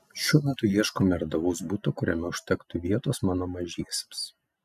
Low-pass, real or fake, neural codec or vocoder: 14.4 kHz; real; none